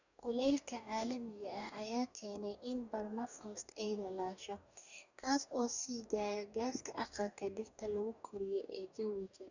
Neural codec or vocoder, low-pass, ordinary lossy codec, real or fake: codec, 44.1 kHz, 2.6 kbps, DAC; 7.2 kHz; none; fake